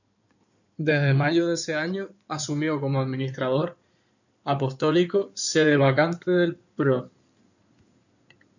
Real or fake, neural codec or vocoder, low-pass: fake; codec, 16 kHz in and 24 kHz out, 2.2 kbps, FireRedTTS-2 codec; 7.2 kHz